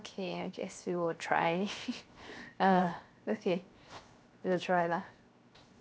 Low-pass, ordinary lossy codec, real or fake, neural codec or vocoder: none; none; fake; codec, 16 kHz, 0.7 kbps, FocalCodec